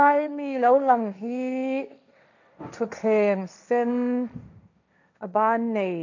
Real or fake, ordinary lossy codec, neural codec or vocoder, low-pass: fake; none; codec, 16 kHz, 1.1 kbps, Voila-Tokenizer; 7.2 kHz